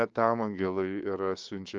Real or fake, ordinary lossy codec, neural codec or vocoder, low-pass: fake; Opus, 32 kbps; codec, 16 kHz, 6 kbps, DAC; 7.2 kHz